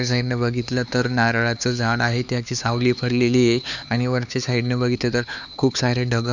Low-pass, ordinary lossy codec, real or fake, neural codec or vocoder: 7.2 kHz; none; fake; codec, 16 kHz, 4 kbps, X-Codec, HuBERT features, trained on LibriSpeech